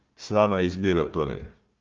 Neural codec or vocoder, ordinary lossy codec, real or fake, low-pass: codec, 16 kHz, 1 kbps, FunCodec, trained on Chinese and English, 50 frames a second; Opus, 32 kbps; fake; 7.2 kHz